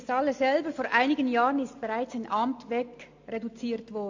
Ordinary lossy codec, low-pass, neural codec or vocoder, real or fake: AAC, 48 kbps; 7.2 kHz; none; real